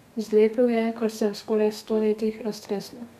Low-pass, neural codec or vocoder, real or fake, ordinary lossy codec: 14.4 kHz; codec, 32 kHz, 1.9 kbps, SNAC; fake; none